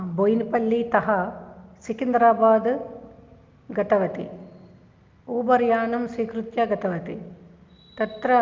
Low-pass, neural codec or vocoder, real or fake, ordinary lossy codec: 7.2 kHz; none; real; Opus, 24 kbps